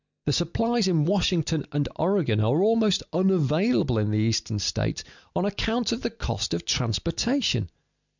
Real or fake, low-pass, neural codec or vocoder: real; 7.2 kHz; none